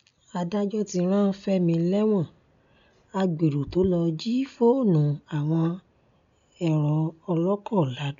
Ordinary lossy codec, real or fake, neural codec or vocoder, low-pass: none; real; none; 7.2 kHz